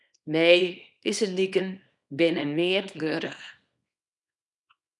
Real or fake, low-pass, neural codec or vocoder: fake; 10.8 kHz; codec, 24 kHz, 0.9 kbps, WavTokenizer, small release